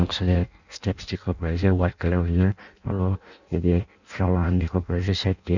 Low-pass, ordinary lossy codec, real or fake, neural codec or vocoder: 7.2 kHz; none; fake; codec, 16 kHz in and 24 kHz out, 0.6 kbps, FireRedTTS-2 codec